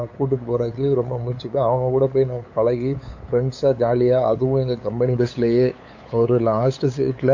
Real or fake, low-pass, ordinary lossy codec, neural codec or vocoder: fake; 7.2 kHz; AAC, 48 kbps; codec, 16 kHz, 8 kbps, FunCodec, trained on LibriTTS, 25 frames a second